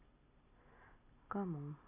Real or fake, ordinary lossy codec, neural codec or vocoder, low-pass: real; AAC, 32 kbps; none; 3.6 kHz